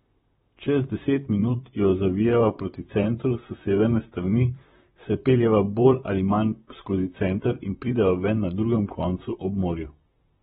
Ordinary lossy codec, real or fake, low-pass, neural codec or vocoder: AAC, 16 kbps; fake; 19.8 kHz; vocoder, 44.1 kHz, 128 mel bands, Pupu-Vocoder